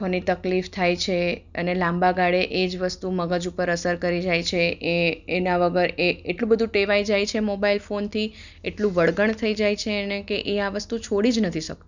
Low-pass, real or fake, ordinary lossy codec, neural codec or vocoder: 7.2 kHz; real; none; none